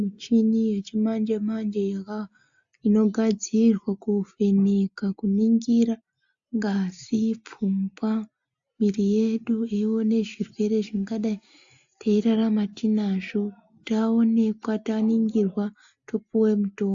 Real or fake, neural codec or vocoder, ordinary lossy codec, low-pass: real; none; AAC, 64 kbps; 7.2 kHz